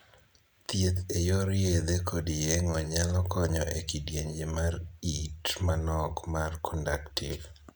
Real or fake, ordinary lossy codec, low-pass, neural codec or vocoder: real; none; none; none